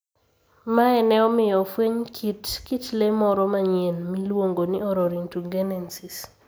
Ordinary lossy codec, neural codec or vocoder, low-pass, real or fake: none; none; none; real